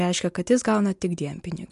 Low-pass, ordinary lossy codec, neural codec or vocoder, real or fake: 10.8 kHz; MP3, 64 kbps; none; real